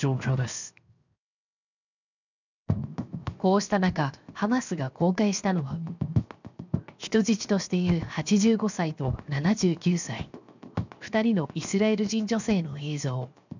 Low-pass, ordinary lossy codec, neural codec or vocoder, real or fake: 7.2 kHz; none; codec, 16 kHz, 0.7 kbps, FocalCodec; fake